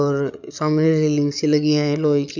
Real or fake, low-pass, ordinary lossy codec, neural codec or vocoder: fake; 7.2 kHz; none; codec, 16 kHz, 16 kbps, FreqCodec, larger model